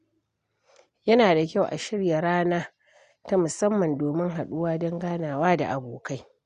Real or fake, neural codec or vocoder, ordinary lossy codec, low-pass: real; none; Opus, 64 kbps; 9.9 kHz